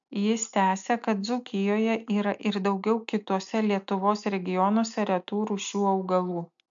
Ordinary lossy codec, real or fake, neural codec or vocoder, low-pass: MP3, 64 kbps; real; none; 7.2 kHz